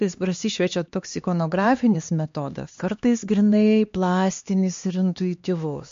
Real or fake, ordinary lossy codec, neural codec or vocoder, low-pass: fake; MP3, 48 kbps; codec, 16 kHz, 2 kbps, X-Codec, HuBERT features, trained on LibriSpeech; 7.2 kHz